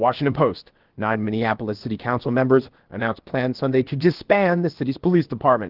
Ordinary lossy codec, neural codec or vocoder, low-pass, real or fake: Opus, 16 kbps; codec, 16 kHz, about 1 kbps, DyCAST, with the encoder's durations; 5.4 kHz; fake